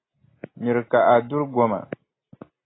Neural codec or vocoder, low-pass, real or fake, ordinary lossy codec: none; 7.2 kHz; real; AAC, 16 kbps